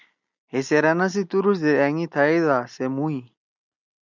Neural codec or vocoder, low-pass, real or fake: none; 7.2 kHz; real